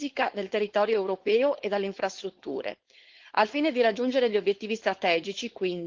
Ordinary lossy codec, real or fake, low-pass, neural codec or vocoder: Opus, 16 kbps; fake; 7.2 kHz; codec, 16 kHz, 4.8 kbps, FACodec